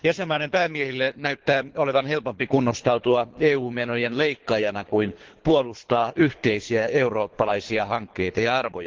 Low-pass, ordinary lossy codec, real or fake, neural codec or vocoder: 7.2 kHz; Opus, 16 kbps; fake; codec, 24 kHz, 3 kbps, HILCodec